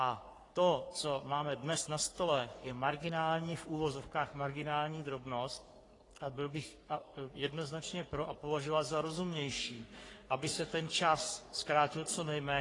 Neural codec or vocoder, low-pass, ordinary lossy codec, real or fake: codec, 44.1 kHz, 3.4 kbps, Pupu-Codec; 10.8 kHz; AAC, 32 kbps; fake